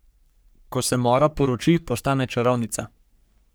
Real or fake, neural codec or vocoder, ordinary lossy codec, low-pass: fake; codec, 44.1 kHz, 3.4 kbps, Pupu-Codec; none; none